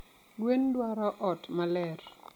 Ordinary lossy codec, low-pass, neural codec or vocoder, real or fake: MP3, 96 kbps; 19.8 kHz; none; real